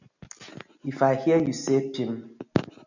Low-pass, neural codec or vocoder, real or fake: 7.2 kHz; none; real